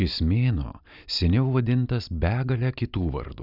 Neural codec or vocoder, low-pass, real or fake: none; 5.4 kHz; real